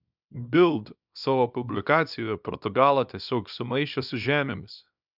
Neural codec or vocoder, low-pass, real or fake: codec, 24 kHz, 0.9 kbps, WavTokenizer, small release; 5.4 kHz; fake